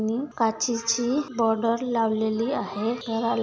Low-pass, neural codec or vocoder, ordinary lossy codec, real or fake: none; none; none; real